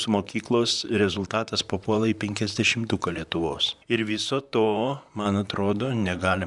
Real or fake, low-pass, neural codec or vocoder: fake; 10.8 kHz; vocoder, 44.1 kHz, 128 mel bands, Pupu-Vocoder